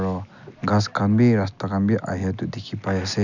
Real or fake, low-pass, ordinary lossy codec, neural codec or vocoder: real; 7.2 kHz; none; none